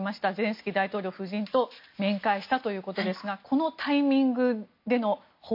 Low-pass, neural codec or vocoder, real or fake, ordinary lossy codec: 5.4 kHz; none; real; MP3, 32 kbps